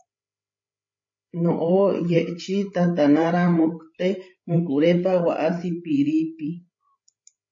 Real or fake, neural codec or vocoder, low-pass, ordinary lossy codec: fake; codec, 16 kHz, 8 kbps, FreqCodec, larger model; 7.2 kHz; MP3, 32 kbps